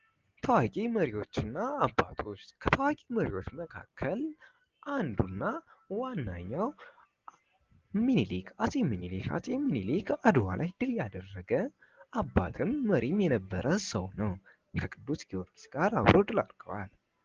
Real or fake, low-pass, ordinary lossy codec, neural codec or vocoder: real; 7.2 kHz; Opus, 16 kbps; none